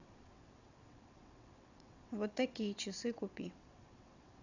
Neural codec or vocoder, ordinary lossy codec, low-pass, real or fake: vocoder, 22.05 kHz, 80 mel bands, WaveNeXt; none; 7.2 kHz; fake